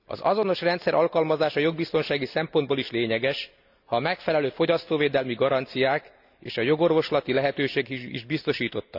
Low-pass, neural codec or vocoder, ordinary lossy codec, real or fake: 5.4 kHz; none; none; real